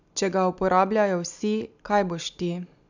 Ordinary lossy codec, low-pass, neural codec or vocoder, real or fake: none; 7.2 kHz; none; real